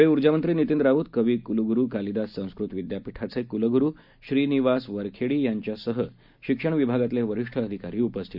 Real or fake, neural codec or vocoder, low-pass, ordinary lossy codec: real; none; 5.4 kHz; none